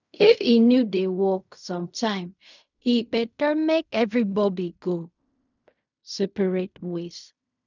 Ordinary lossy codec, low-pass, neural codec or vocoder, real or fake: none; 7.2 kHz; codec, 16 kHz in and 24 kHz out, 0.4 kbps, LongCat-Audio-Codec, fine tuned four codebook decoder; fake